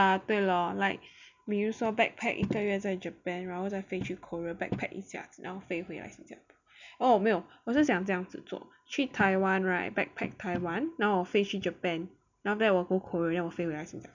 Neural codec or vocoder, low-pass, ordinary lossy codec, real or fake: none; 7.2 kHz; none; real